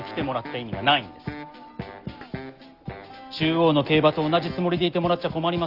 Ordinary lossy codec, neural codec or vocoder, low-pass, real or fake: Opus, 24 kbps; none; 5.4 kHz; real